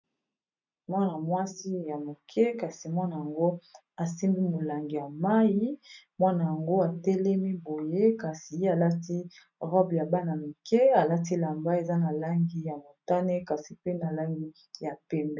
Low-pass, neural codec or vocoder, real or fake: 7.2 kHz; none; real